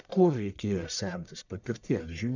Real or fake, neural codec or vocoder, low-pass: fake; codec, 44.1 kHz, 1.7 kbps, Pupu-Codec; 7.2 kHz